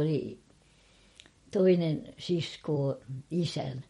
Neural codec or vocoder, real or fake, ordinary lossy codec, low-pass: vocoder, 44.1 kHz, 128 mel bands every 256 samples, BigVGAN v2; fake; MP3, 48 kbps; 19.8 kHz